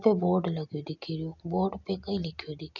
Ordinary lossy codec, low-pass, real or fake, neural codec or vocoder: none; 7.2 kHz; real; none